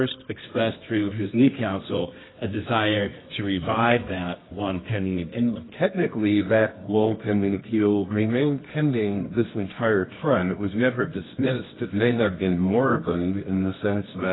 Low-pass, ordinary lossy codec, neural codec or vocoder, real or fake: 7.2 kHz; AAC, 16 kbps; codec, 24 kHz, 0.9 kbps, WavTokenizer, medium music audio release; fake